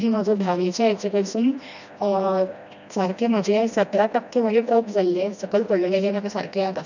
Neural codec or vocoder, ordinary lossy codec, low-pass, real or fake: codec, 16 kHz, 1 kbps, FreqCodec, smaller model; none; 7.2 kHz; fake